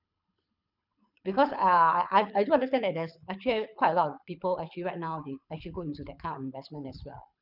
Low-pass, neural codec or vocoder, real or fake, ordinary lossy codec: 5.4 kHz; codec, 24 kHz, 6 kbps, HILCodec; fake; none